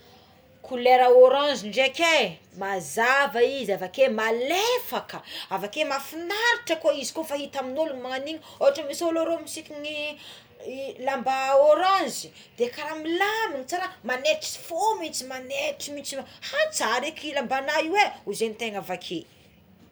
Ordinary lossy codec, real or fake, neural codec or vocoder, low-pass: none; real; none; none